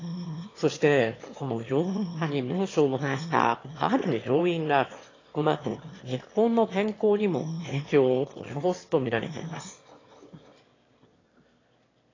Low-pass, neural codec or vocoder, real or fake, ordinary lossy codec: 7.2 kHz; autoencoder, 22.05 kHz, a latent of 192 numbers a frame, VITS, trained on one speaker; fake; AAC, 32 kbps